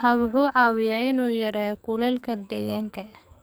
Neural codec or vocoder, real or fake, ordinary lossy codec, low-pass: codec, 44.1 kHz, 2.6 kbps, SNAC; fake; none; none